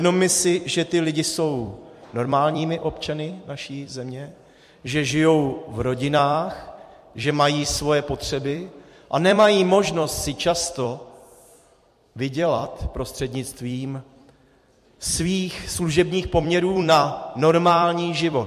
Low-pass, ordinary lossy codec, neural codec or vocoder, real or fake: 14.4 kHz; MP3, 64 kbps; vocoder, 44.1 kHz, 128 mel bands every 256 samples, BigVGAN v2; fake